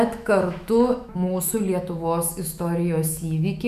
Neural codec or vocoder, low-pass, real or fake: autoencoder, 48 kHz, 128 numbers a frame, DAC-VAE, trained on Japanese speech; 14.4 kHz; fake